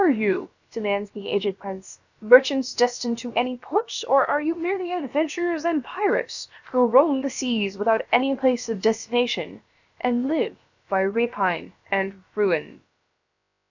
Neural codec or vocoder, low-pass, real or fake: codec, 16 kHz, about 1 kbps, DyCAST, with the encoder's durations; 7.2 kHz; fake